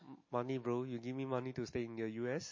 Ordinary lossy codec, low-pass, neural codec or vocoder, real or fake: MP3, 32 kbps; 7.2 kHz; none; real